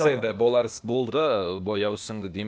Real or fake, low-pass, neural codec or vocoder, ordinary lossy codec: fake; none; codec, 16 kHz, 0.8 kbps, ZipCodec; none